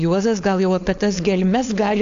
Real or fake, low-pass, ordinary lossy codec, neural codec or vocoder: fake; 7.2 kHz; AAC, 64 kbps; codec, 16 kHz, 4.8 kbps, FACodec